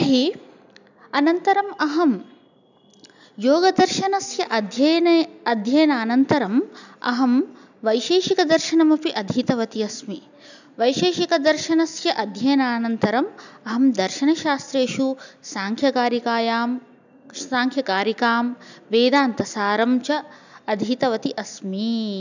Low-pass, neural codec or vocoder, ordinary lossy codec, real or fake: 7.2 kHz; none; none; real